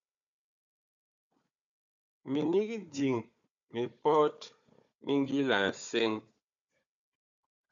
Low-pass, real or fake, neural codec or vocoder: 7.2 kHz; fake; codec, 16 kHz, 4 kbps, FunCodec, trained on Chinese and English, 50 frames a second